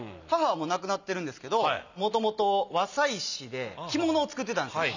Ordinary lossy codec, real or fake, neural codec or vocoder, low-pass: none; real; none; 7.2 kHz